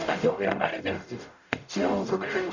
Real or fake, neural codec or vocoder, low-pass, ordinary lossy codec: fake; codec, 44.1 kHz, 0.9 kbps, DAC; 7.2 kHz; none